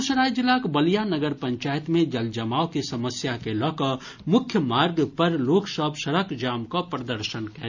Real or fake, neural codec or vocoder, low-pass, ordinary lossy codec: real; none; 7.2 kHz; none